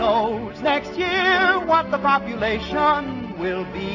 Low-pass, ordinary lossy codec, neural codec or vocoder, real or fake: 7.2 kHz; MP3, 32 kbps; none; real